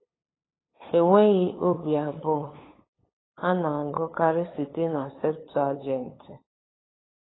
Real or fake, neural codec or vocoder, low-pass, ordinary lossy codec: fake; codec, 16 kHz, 8 kbps, FunCodec, trained on LibriTTS, 25 frames a second; 7.2 kHz; AAC, 16 kbps